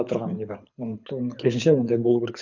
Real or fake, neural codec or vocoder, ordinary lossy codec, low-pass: fake; codec, 24 kHz, 6 kbps, HILCodec; none; 7.2 kHz